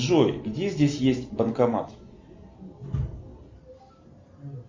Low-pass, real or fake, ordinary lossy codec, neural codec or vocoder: 7.2 kHz; real; MP3, 64 kbps; none